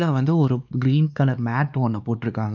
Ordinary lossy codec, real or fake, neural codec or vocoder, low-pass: none; fake; codec, 16 kHz, 2 kbps, X-Codec, WavLM features, trained on Multilingual LibriSpeech; 7.2 kHz